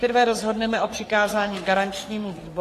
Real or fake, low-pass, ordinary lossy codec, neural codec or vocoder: fake; 14.4 kHz; AAC, 48 kbps; codec, 44.1 kHz, 3.4 kbps, Pupu-Codec